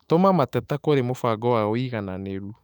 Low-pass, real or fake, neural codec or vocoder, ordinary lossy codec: 19.8 kHz; fake; autoencoder, 48 kHz, 128 numbers a frame, DAC-VAE, trained on Japanese speech; none